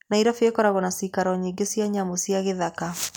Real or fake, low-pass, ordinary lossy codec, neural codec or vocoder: real; none; none; none